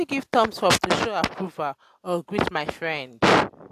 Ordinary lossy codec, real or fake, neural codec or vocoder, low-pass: AAC, 64 kbps; real; none; 14.4 kHz